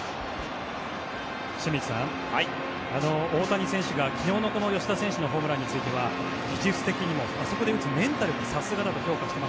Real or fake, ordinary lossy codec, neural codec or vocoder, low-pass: real; none; none; none